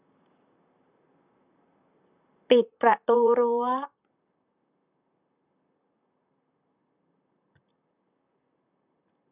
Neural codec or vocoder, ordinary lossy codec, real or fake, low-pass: vocoder, 44.1 kHz, 128 mel bands, Pupu-Vocoder; none; fake; 3.6 kHz